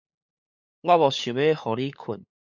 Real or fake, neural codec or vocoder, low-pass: fake; codec, 16 kHz, 8 kbps, FunCodec, trained on LibriTTS, 25 frames a second; 7.2 kHz